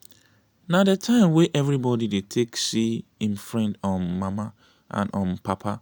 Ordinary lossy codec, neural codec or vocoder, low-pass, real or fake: none; none; none; real